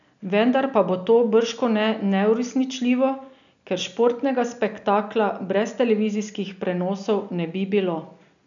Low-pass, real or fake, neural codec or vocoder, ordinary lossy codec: 7.2 kHz; real; none; none